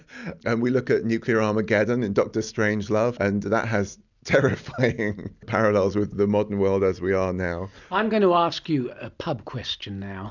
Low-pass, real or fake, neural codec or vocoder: 7.2 kHz; real; none